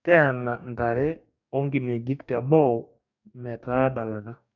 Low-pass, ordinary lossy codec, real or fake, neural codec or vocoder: 7.2 kHz; none; fake; codec, 44.1 kHz, 2.6 kbps, DAC